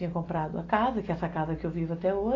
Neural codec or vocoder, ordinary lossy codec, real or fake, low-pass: none; AAC, 32 kbps; real; 7.2 kHz